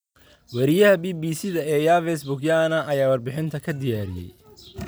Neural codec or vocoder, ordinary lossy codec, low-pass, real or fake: none; none; none; real